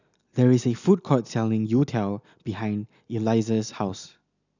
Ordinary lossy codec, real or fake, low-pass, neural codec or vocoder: none; real; 7.2 kHz; none